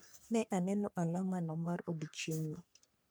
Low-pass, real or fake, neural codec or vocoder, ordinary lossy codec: none; fake; codec, 44.1 kHz, 3.4 kbps, Pupu-Codec; none